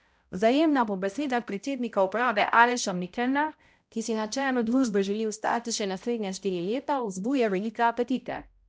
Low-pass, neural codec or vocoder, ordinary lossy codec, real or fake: none; codec, 16 kHz, 0.5 kbps, X-Codec, HuBERT features, trained on balanced general audio; none; fake